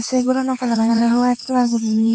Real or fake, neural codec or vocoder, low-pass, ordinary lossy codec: fake; codec, 16 kHz, 4 kbps, X-Codec, HuBERT features, trained on LibriSpeech; none; none